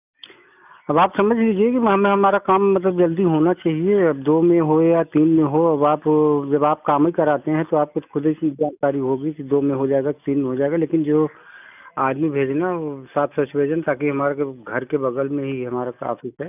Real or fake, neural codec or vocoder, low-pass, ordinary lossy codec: real; none; 3.6 kHz; none